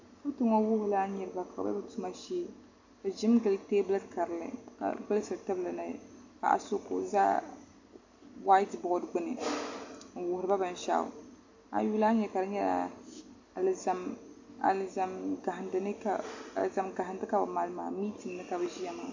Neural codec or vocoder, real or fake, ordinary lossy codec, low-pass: none; real; AAC, 48 kbps; 7.2 kHz